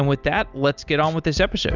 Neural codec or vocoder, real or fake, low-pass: none; real; 7.2 kHz